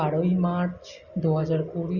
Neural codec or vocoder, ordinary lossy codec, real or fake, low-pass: none; Opus, 64 kbps; real; 7.2 kHz